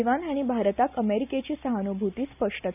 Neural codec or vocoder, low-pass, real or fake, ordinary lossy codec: none; 3.6 kHz; real; none